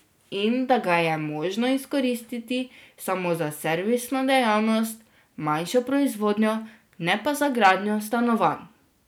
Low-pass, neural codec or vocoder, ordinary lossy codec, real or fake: none; none; none; real